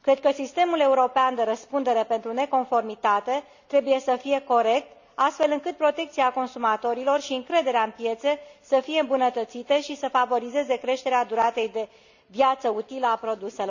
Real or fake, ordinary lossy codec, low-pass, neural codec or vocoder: real; none; 7.2 kHz; none